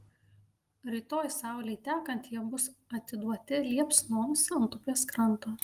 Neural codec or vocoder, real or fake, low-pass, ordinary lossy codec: none; real; 14.4 kHz; Opus, 24 kbps